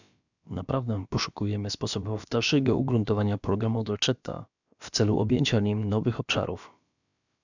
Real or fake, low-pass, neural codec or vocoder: fake; 7.2 kHz; codec, 16 kHz, about 1 kbps, DyCAST, with the encoder's durations